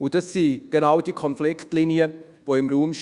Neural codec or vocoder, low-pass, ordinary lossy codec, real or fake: codec, 24 kHz, 1.2 kbps, DualCodec; 10.8 kHz; Opus, 64 kbps; fake